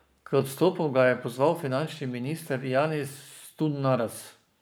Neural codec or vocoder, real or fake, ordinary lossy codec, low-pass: codec, 44.1 kHz, 7.8 kbps, Pupu-Codec; fake; none; none